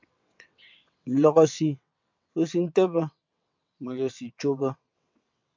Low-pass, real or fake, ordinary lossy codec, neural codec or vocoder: 7.2 kHz; fake; MP3, 64 kbps; vocoder, 22.05 kHz, 80 mel bands, WaveNeXt